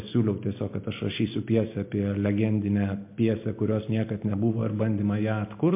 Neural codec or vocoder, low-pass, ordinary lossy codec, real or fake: none; 3.6 kHz; MP3, 24 kbps; real